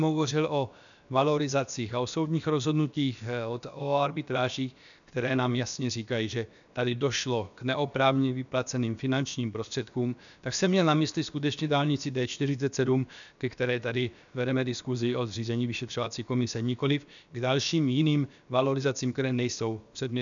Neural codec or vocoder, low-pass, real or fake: codec, 16 kHz, about 1 kbps, DyCAST, with the encoder's durations; 7.2 kHz; fake